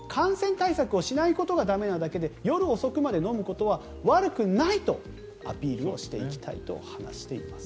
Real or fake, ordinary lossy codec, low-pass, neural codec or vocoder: real; none; none; none